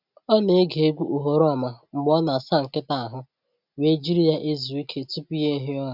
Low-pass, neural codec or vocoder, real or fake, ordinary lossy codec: 5.4 kHz; none; real; none